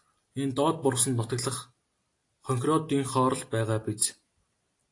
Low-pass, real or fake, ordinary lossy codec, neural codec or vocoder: 10.8 kHz; real; AAC, 48 kbps; none